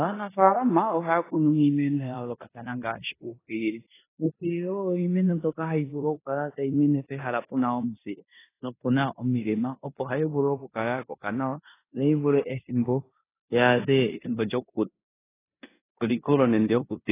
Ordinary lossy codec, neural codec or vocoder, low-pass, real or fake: AAC, 16 kbps; codec, 16 kHz in and 24 kHz out, 0.9 kbps, LongCat-Audio-Codec, four codebook decoder; 3.6 kHz; fake